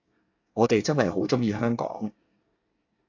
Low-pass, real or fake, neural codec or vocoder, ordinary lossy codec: 7.2 kHz; fake; codec, 24 kHz, 1 kbps, SNAC; AAC, 48 kbps